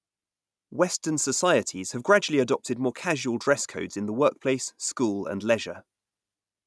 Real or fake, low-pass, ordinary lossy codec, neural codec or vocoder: real; none; none; none